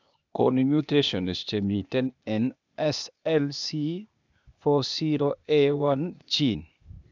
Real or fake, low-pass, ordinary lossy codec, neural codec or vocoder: fake; 7.2 kHz; none; codec, 16 kHz, 0.8 kbps, ZipCodec